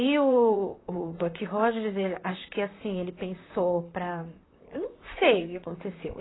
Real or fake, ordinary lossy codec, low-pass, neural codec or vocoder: fake; AAC, 16 kbps; 7.2 kHz; vocoder, 44.1 kHz, 128 mel bands, Pupu-Vocoder